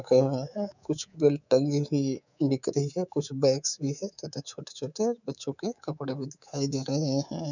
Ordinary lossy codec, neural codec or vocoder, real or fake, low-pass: none; codec, 24 kHz, 3.1 kbps, DualCodec; fake; 7.2 kHz